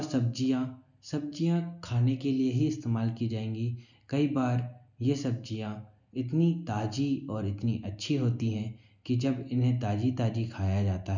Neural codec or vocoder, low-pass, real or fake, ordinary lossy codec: none; 7.2 kHz; real; none